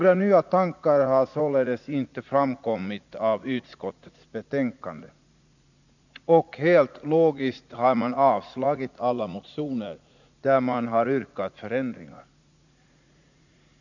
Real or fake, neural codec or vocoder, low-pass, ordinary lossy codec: fake; vocoder, 44.1 kHz, 80 mel bands, Vocos; 7.2 kHz; none